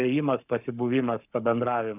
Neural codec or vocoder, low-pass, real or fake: codec, 44.1 kHz, 7.8 kbps, Pupu-Codec; 3.6 kHz; fake